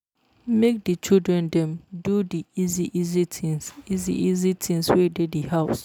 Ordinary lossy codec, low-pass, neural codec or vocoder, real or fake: none; none; none; real